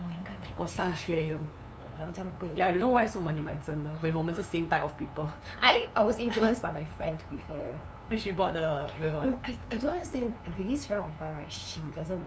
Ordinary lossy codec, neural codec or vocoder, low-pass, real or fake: none; codec, 16 kHz, 2 kbps, FunCodec, trained on LibriTTS, 25 frames a second; none; fake